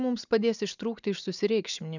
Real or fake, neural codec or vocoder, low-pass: real; none; 7.2 kHz